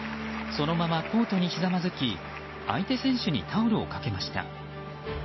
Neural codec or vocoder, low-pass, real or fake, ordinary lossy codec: none; 7.2 kHz; real; MP3, 24 kbps